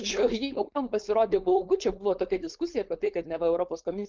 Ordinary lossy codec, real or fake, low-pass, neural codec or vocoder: Opus, 24 kbps; fake; 7.2 kHz; codec, 16 kHz, 4.8 kbps, FACodec